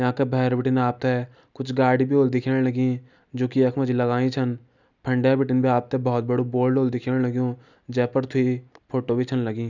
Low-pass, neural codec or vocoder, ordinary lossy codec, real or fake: 7.2 kHz; none; none; real